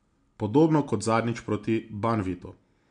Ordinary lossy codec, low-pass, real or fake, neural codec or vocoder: MP3, 64 kbps; 9.9 kHz; real; none